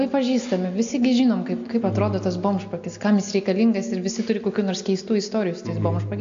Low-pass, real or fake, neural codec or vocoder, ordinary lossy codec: 7.2 kHz; real; none; AAC, 64 kbps